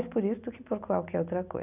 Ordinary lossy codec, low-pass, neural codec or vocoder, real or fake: none; 3.6 kHz; none; real